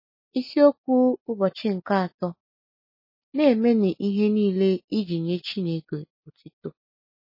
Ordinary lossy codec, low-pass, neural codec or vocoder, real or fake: MP3, 24 kbps; 5.4 kHz; none; real